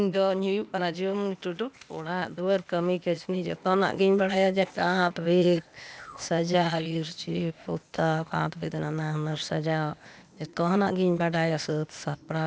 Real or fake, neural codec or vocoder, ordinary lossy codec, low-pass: fake; codec, 16 kHz, 0.8 kbps, ZipCodec; none; none